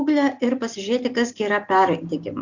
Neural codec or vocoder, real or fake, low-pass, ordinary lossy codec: none; real; 7.2 kHz; Opus, 64 kbps